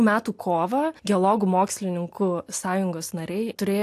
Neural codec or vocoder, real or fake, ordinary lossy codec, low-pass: none; real; AAC, 64 kbps; 14.4 kHz